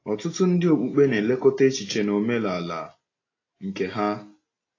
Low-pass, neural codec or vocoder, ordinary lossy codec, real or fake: 7.2 kHz; none; AAC, 32 kbps; real